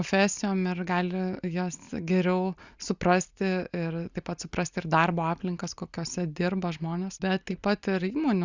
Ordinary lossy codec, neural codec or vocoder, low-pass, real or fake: Opus, 64 kbps; none; 7.2 kHz; real